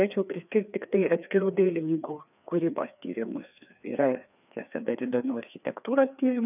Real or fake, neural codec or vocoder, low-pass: fake; codec, 16 kHz, 2 kbps, FreqCodec, larger model; 3.6 kHz